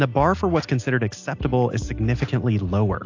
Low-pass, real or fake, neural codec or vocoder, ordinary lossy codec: 7.2 kHz; real; none; AAC, 48 kbps